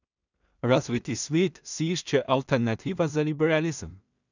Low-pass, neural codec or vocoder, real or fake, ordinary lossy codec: 7.2 kHz; codec, 16 kHz in and 24 kHz out, 0.4 kbps, LongCat-Audio-Codec, two codebook decoder; fake; none